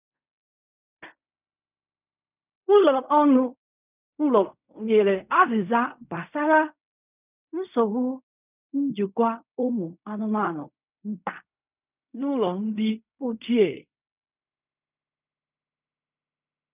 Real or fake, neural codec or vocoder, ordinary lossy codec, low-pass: fake; codec, 16 kHz in and 24 kHz out, 0.4 kbps, LongCat-Audio-Codec, fine tuned four codebook decoder; none; 3.6 kHz